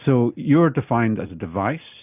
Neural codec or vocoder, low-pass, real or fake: none; 3.6 kHz; real